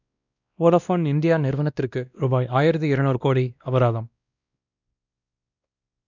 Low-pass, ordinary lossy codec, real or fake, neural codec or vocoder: 7.2 kHz; none; fake; codec, 16 kHz, 1 kbps, X-Codec, WavLM features, trained on Multilingual LibriSpeech